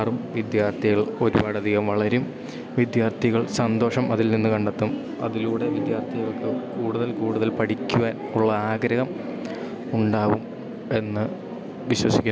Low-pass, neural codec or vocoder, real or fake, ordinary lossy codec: none; none; real; none